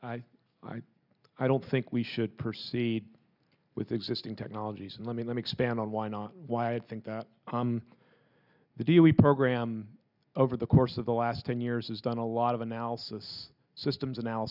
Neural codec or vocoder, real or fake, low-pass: none; real; 5.4 kHz